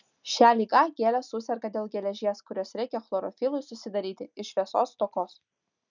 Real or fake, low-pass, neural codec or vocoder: real; 7.2 kHz; none